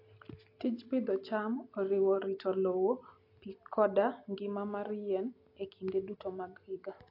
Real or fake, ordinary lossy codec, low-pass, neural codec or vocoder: real; AAC, 32 kbps; 5.4 kHz; none